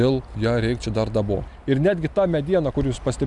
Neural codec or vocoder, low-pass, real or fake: none; 10.8 kHz; real